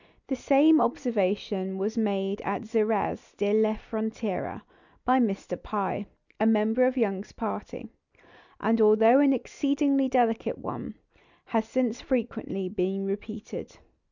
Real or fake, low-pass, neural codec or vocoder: real; 7.2 kHz; none